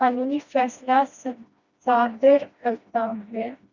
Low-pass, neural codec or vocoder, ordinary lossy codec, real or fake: 7.2 kHz; codec, 16 kHz, 1 kbps, FreqCodec, smaller model; Opus, 64 kbps; fake